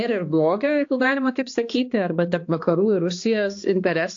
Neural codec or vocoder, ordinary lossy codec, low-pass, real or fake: codec, 16 kHz, 2 kbps, X-Codec, HuBERT features, trained on balanced general audio; AAC, 48 kbps; 7.2 kHz; fake